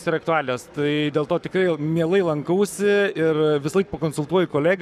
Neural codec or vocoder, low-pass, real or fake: codec, 44.1 kHz, 7.8 kbps, Pupu-Codec; 14.4 kHz; fake